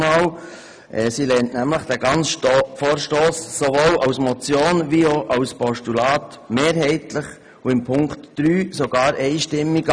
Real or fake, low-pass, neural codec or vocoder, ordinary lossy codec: real; 9.9 kHz; none; none